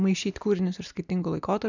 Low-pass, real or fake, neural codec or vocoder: 7.2 kHz; real; none